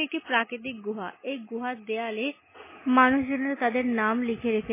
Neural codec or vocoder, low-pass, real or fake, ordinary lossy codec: none; 3.6 kHz; real; MP3, 16 kbps